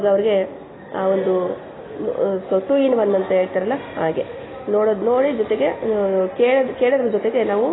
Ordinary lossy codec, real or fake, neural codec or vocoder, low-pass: AAC, 16 kbps; real; none; 7.2 kHz